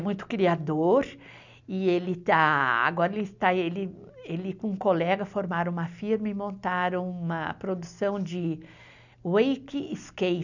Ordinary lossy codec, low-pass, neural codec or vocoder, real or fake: none; 7.2 kHz; none; real